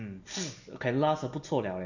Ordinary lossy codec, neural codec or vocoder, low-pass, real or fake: none; none; 7.2 kHz; real